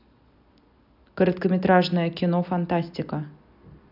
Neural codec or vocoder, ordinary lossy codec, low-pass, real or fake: none; none; 5.4 kHz; real